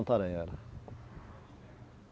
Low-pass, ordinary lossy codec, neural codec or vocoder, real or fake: none; none; none; real